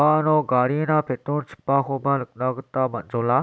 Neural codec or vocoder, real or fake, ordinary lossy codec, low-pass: none; real; none; none